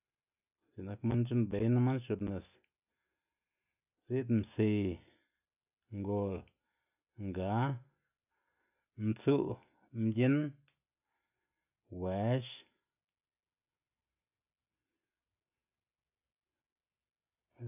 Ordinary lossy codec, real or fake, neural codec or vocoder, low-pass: none; real; none; 3.6 kHz